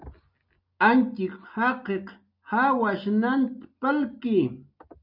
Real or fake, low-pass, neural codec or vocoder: real; 5.4 kHz; none